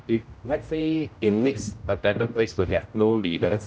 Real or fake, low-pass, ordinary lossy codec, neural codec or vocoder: fake; none; none; codec, 16 kHz, 0.5 kbps, X-Codec, HuBERT features, trained on general audio